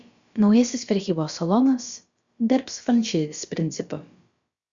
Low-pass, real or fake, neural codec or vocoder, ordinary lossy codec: 7.2 kHz; fake; codec, 16 kHz, about 1 kbps, DyCAST, with the encoder's durations; Opus, 64 kbps